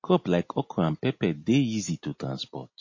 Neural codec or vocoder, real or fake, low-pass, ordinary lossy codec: none; real; 7.2 kHz; MP3, 32 kbps